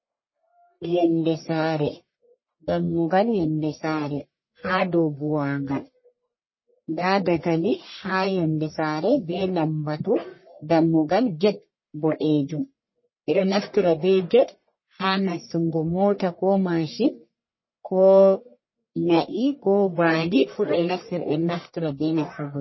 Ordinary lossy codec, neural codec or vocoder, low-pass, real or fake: MP3, 24 kbps; codec, 44.1 kHz, 1.7 kbps, Pupu-Codec; 7.2 kHz; fake